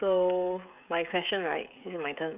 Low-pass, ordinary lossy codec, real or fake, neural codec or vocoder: 3.6 kHz; none; fake; codec, 16 kHz, 16 kbps, FreqCodec, smaller model